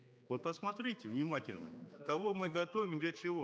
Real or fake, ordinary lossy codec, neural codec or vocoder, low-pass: fake; none; codec, 16 kHz, 2 kbps, X-Codec, HuBERT features, trained on general audio; none